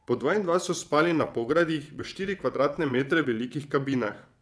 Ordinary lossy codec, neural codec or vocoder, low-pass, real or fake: none; vocoder, 22.05 kHz, 80 mel bands, WaveNeXt; none; fake